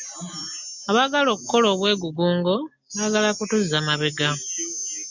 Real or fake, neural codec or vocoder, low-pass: real; none; 7.2 kHz